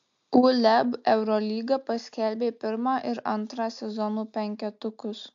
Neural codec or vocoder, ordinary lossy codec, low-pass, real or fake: none; AAC, 64 kbps; 7.2 kHz; real